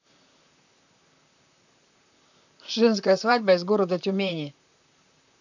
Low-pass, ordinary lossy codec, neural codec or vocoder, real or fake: 7.2 kHz; none; vocoder, 44.1 kHz, 128 mel bands, Pupu-Vocoder; fake